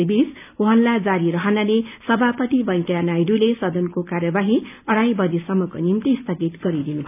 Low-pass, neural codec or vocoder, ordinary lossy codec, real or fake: 3.6 kHz; none; none; real